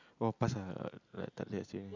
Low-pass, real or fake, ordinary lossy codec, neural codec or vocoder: 7.2 kHz; real; none; none